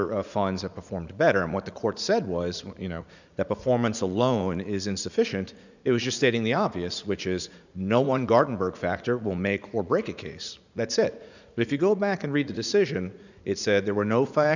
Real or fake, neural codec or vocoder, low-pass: fake; vocoder, 44.1 kHz, 80 mel bands, Vocos; 7.2 kHz